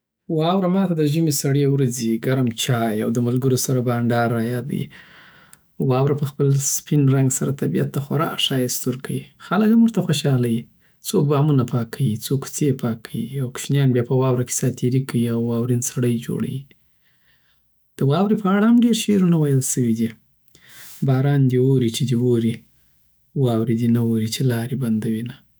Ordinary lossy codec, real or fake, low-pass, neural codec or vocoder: none; fake; none; autoencoder, 48 kHz, 128 numbers a frame, DAC-VAE, trained on Japanese speech